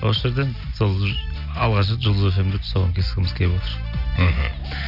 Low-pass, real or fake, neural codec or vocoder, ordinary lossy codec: 5.4 kHz; real; none; none